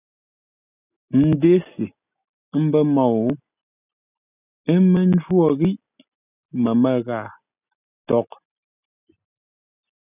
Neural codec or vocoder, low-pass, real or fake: none; 3.6 kHz; real